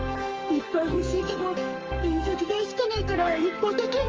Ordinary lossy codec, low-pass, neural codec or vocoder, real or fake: Opus, 24 kbps; 7.2 kHz; codec, 44.1 kHz, 2.6 kbps, SNAC; fake